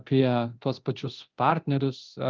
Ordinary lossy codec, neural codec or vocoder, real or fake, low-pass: Opus, 32 kbps; codec, 24 kHz, 0.9 kbps, DualCodec; fake; 7.2 kHz